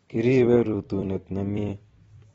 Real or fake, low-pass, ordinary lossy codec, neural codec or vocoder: fake; 19.8 kHz; AAC, 24 kbps; vocoder, 44.1 kHz, 128 mel bands every 256 samples, BigVGAN v2